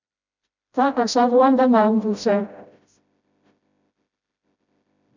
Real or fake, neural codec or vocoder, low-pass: fake; codec, 16 kHz, 0.5 kbps, FreqCodec, smaller model; 7.2 kHz